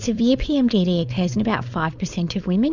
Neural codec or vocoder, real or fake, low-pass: codec, 16 kHz, 4.8 kbps, FACodec; fake; 7.2 kHz